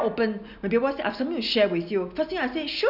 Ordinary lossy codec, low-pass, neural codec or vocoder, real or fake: none; 5.4 kHz; none; real